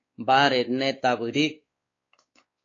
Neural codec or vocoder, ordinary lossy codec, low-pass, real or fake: codec, 16 kHz, 4 kbps, X-Codec, WavLM features, trained on Multilingual LibriSpeech; AAC, 32 kbps; 7.2 kHz; fake